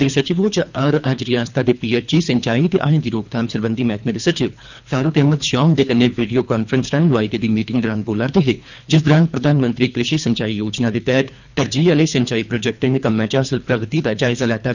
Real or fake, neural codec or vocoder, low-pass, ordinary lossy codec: fake; codec, 24 kHz, 3 kbps, HILCodec; 7.2 kHz; none